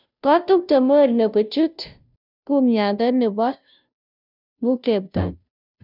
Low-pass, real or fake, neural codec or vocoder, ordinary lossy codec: 5.4 kHz; fake; codec, 16 kHz, 0.5 kbps, FunCodec, trained on Chinese and English, 25 frames a second; none